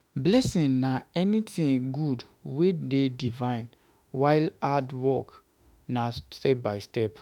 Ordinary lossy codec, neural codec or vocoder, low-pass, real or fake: none; autoencoder, 48 kHz, 32 numbers a frame, DAC-VAE, trained on Japanese speech; 19.8 kHz; fake